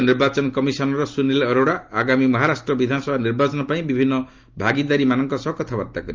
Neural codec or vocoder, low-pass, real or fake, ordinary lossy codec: none; 7.2 kHz; real; Opus, 24 kbps